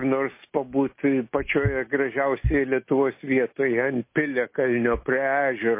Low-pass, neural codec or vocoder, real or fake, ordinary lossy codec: 3.6 kHz; none; real; MP3, 24 kbps